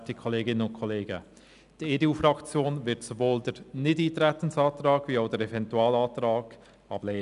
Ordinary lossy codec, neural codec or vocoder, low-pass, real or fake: none; none; 10.8 kHz; real